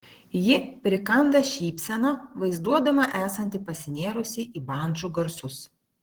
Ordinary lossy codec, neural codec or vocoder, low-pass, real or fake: Opus, 16 kbps; vocoder, 44.1 kHz, 128 mel bands, Pupu-Vocoder; 19.8 kHz; fake